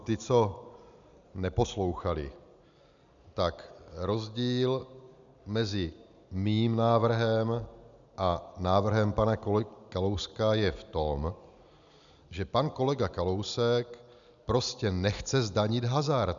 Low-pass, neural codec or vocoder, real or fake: 7.2 kHz; none; real